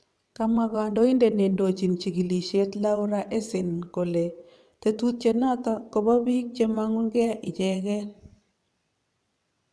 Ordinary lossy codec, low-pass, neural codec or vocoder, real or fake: none; none; vocoder, 22.05 kHz, 80 mel bands, WaveNeXt; fake